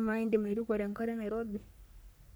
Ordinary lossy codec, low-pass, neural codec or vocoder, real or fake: none; none; codec, 44.1 kHz, 3.4 kbps, Pupu-Codec; fake